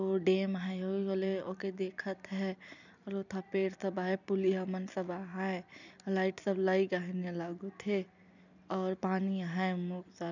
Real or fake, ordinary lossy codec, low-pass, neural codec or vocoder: fake; none; 7.2 kHz; vocoder, 44.1 kHz, 128 mel bands every 256 samples, BigVGAN v2